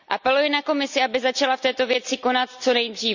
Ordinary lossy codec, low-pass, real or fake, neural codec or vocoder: none; 7.2 kHz; real; none